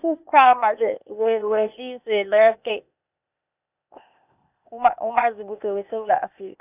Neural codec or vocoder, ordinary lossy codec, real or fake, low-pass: codec, 16 kHz, 0.8 kbps, ZipCodec; none; fake; 3.6 kHz